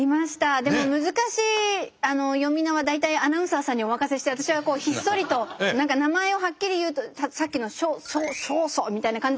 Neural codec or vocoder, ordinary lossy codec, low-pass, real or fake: none; none; none; real